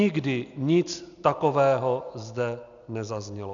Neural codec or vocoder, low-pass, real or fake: none; 7.2 kHz; real